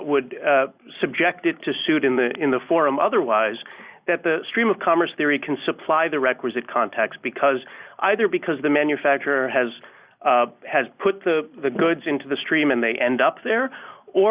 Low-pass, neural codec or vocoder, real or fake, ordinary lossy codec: 3.6 kHz; none; real; Opus, 64 kbps